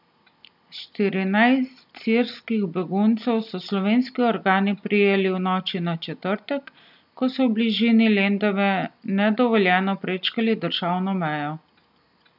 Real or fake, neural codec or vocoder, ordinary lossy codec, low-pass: real; none; none; 5.4 kHz